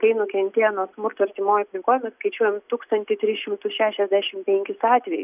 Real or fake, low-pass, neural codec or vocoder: real; 3.6 kHz; none